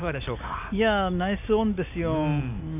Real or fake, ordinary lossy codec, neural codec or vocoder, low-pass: real; none; none; 3.6 kHz